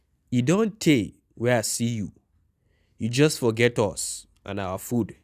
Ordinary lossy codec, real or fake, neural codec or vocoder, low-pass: none; real; none; 14.4 kHz